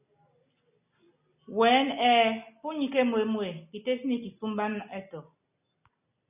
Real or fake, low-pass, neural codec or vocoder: real; 3.6 kHz; none